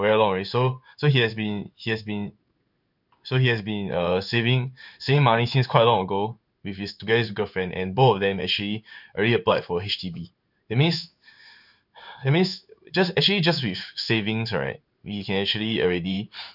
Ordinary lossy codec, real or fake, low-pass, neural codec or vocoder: none; fake; 5.4 kHz; codec, 16 kHz in and 24 kHz out, 1 kbps, XY-Tokenizer